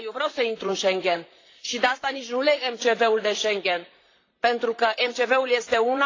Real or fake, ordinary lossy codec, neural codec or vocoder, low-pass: fake; AAC, 32 kbps; vocoder, 44.1 kHz, 128 mel bands, Pupu-Vocoder; 7.2 kHz